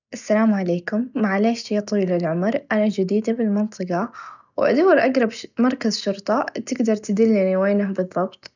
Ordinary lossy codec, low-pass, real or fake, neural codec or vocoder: none; 7.2 kHz; real; none